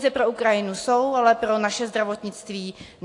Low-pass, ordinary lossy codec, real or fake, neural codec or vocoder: 10.8 kHz; AAC, 48 kbps; real; none